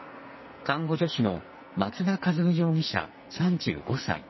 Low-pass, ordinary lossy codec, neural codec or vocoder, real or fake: 7.2 kHz; MP3, 24 kbps; codec, 44.1 kHz, 2.6 kbps, SNAC; fake